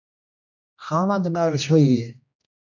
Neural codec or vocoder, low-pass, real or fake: codec, 16 kHz, 1 kbps, X-Codec, HuBERT features, trained on general audio; 7.2 kHz; fake